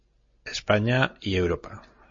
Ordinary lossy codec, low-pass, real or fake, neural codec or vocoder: MP3, 32 kbps; 7.2 kHz; real; none